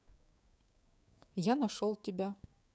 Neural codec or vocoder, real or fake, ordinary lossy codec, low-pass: codec, 16 kHz, 6 kbps, DAC; fake; none; none